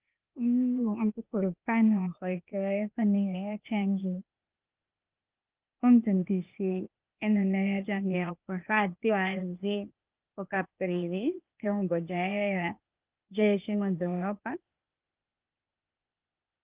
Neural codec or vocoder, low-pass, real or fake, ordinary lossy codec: codec, 16 kHz, 0.8 kbps, ZipCodec; 3.6 kHz; fake; Opus, 24 kbps